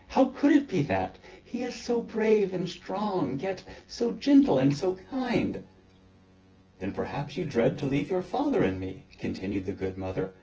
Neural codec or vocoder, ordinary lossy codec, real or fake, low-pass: vocoder, 24 kHz, 100 mel bands, Vocos; Opus, 16 kbps; fake; 7.2 kHz